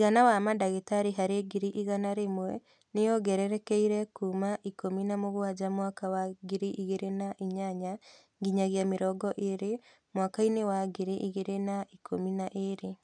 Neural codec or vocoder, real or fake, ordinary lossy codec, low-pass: none; real; none; 9.9 kHz